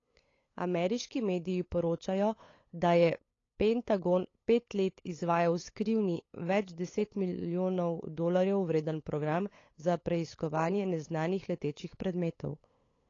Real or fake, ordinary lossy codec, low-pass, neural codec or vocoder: fake; AAC, 32 kbps; 7.2 kHz; codec, 16 kHz, 8 kbps, FunCodec, trained on LibriTTS, 25 frames a second